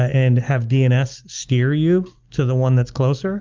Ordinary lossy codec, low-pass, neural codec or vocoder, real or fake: Opus, 32 kbps; 7.2 kHz; none; real